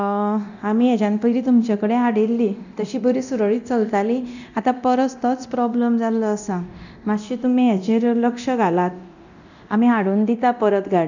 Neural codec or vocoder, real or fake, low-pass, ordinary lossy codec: codec, 24 kHz, 0.9 kbps, DualCodec; fake; 7.2 kHz; none